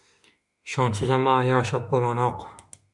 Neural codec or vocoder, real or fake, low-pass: autoencoder, 48 kHz, 32 numbers a frame, DAC-VAE, trained on Japanese speech; fake; 10.8 kHz